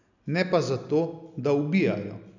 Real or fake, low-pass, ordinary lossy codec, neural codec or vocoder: real; 7.2 kHz; none; none